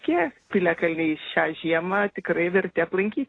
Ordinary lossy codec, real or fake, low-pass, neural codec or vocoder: AAC, 32 kbps; real; 9.9 kHz; none